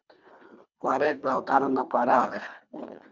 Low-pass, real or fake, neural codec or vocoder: 7.2 kHz; fake; codec, 24 kHz, 1.5 kbps, HILCodec